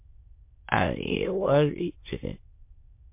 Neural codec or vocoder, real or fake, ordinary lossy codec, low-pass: autoencoder, 22.05 kHz, a latent of 192 numbers a frame, VITS, trained on many speakers; fake; MP3, 24 kbps; 3.6 kHz